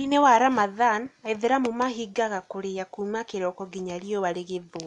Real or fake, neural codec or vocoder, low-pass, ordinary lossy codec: real; none; 10.8 kHz; none